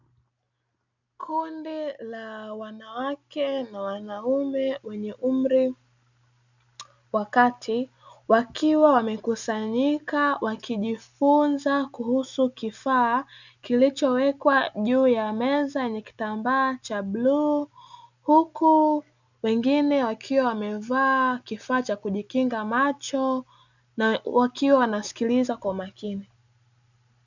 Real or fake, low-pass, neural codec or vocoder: real; 7.2 kHz; none